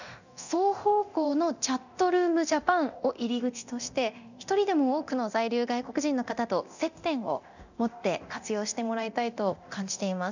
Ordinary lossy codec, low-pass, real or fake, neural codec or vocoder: none; 7.2 kHz; fake; codec, 24 kHz, 0.9 kbps, DualCodec